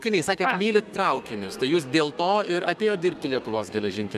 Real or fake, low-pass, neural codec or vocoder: fake; 14.4 kHz; codec, 32 kHz, 1.9 kbps, SNAC